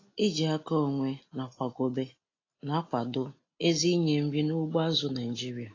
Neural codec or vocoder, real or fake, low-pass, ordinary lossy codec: none; real; 7.2 kHz; AAC, 32 kbps